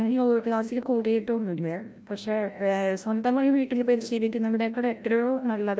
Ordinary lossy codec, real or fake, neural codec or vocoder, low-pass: none; fake; codec, 16 kHz, 0.5 kbps, FreqCodec, larger model; none